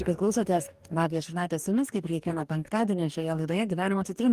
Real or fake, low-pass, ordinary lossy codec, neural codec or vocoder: fake; 14.4 kHz; Opus, 24 kbps; codec, 44.1 kHz, 2.6 kbps, DAC